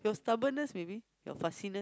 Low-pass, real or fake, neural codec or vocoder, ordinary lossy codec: none; real; none; none